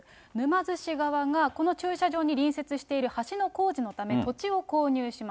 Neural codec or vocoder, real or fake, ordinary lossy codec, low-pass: none; real; none; none